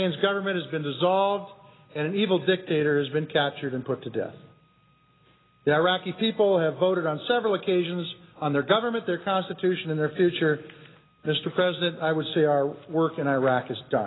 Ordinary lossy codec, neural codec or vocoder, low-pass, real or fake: AAC, 16 kbps; none; 7.2 kHz; real